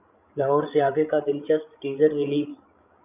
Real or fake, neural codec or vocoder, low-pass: fake; codec, 16 kHz, 16 kbps, FreqCodec, larger model; 3.6 kHz